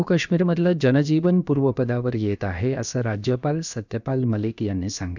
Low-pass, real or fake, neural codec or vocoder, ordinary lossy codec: 7.2 kHz; fake; codec, 16 kHz, about 1 kbps, DyCAST, with the encoder's durations; none